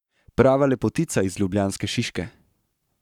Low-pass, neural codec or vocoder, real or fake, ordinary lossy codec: 19.8 kHz; codec, 44.1 kHz, 7.8 kbps, Pupu-Codec; fake; none